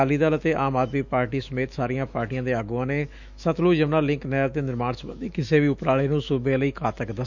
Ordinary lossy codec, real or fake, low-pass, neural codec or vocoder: none; fake; 7.2 kHz; autoencoder, 48 kHz, 128 numbers a frame, DAC-VAE, trained on Japanese speech